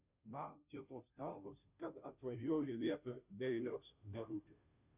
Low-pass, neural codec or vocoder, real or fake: 3.6 kHz; codec, 16 kHz, 0.5 kbps, FunCodec, trained on Chinese and English, 25 frames a second; fake